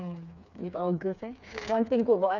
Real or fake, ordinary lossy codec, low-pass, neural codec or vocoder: fake; none; 7.2 kHz; codec, 16 kHz, 4 kbps, FreqCodec, smaller model